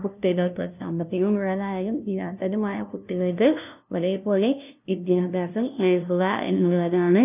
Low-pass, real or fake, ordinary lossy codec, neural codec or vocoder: 3.6 kHz; fake; none; codec, 16 kHz, 0.5 kbps, FunCodec, trained on LibriTTS, 25 frames a second